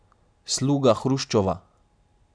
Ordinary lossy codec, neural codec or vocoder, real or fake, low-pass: none; none; real; 9.9 kHz